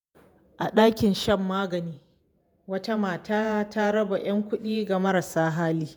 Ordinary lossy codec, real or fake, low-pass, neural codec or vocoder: none; fake; none; vocoder, 48 kHz, 128 mel bands, Vocos